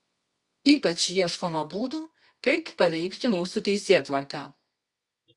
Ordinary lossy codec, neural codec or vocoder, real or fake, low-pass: Opus, 64 kbps; codec, 24 kHz, 0.9 kbps, WavTokenizer, medium music audio release; fake; 10.8 kHz